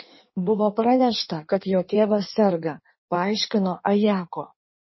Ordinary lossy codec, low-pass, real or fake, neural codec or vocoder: MP3, 24 kbps; 7.2 kHz; fake; codec, 16 kHz in and 24 kHz out, 1.1 kbps, FireRedTTS-2 codec